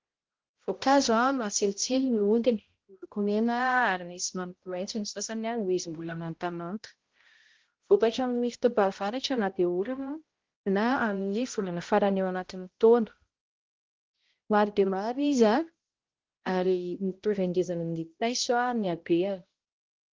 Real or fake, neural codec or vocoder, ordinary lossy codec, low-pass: fake; codec, 16 kHz, 0.5 kbps, X-Codec, HuBERT features, trained on balanced general audio; Opus, 16 kbps; 7.2 kHz